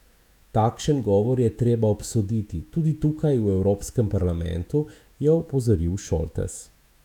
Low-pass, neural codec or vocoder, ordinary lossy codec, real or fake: 19.8 kHz; autoencoder, 48 kHz, 128 numbers a frame, DAC-VAE, trained on Japanese speech; none; fake